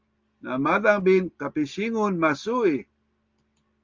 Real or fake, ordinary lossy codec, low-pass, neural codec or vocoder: real; Opus, 32 kbps; 7.2 kHz; none